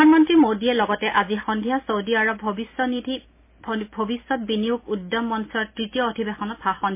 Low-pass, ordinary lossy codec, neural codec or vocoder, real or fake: 3.6 kHz; MP3, 24 kbps; none; real